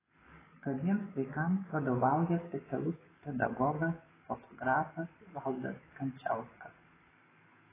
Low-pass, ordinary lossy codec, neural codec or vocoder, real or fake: 3.6 kHz; AAC, 16 kbps; codec, 44.1 kHz, 7.8 kbps, DAC; fake